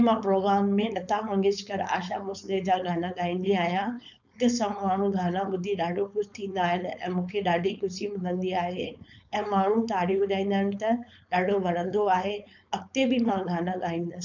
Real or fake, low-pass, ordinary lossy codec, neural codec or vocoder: fake; 7.2 kHz; none; codec, 16 kHz, 4.8 kbps, FACodec